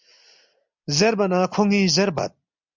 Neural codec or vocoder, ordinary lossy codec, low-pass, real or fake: none; MP3, 64 kbps; 7.2 kHz; real